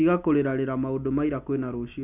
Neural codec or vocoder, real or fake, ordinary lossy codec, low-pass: none; real; none; 3.6 kHz